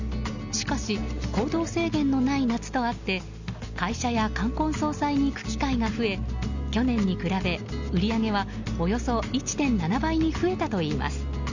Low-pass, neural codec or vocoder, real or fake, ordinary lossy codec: 7.2 kHz; none; real; Opus, 64 kbps